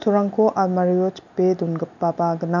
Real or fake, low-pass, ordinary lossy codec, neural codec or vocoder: real; 7.2 kHz; none; none